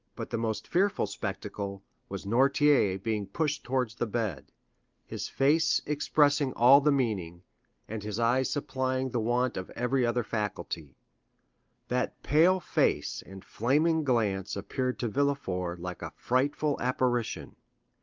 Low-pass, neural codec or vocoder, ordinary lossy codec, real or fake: 7.2 kHz; none; Opus, 32 kbps; real